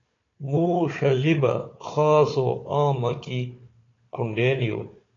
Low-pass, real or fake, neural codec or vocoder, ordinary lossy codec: 7.2 kHz; fake; codec, 16 kHz, 4 kbps, FunCodec, trained on Chinese and English, 50 frames a second; AAC, 32 kbps